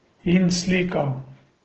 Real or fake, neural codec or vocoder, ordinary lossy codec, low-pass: real; none; Opus, 16 kbps; 7.2 kHz